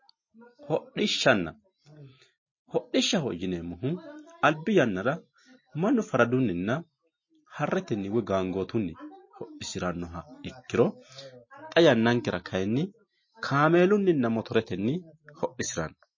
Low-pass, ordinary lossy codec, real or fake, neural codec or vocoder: 7.2 kHz; MP3, 32 kbps; real; none